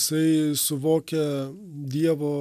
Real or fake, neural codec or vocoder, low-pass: real; none; 14.4 kHz